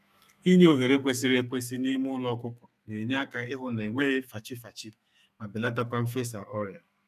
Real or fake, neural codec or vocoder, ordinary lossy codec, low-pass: fake; codec, 32 kHz, 1.9 kbps, SNAC; none; 14.4 kHz